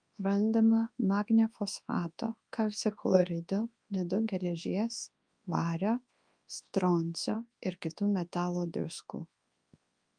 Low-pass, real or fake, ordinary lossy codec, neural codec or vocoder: 9.9 kHz; fake; Opus, 32 kbps; codec, 24 kHz, 0.9 kbps, WavTokenizer, large speech release